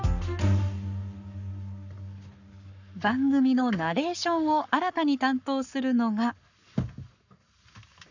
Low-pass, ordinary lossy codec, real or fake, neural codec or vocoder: 7.2 kHz; none; fake; codec, 44.1 kHz, 7.8 kbps, Pupu-Codec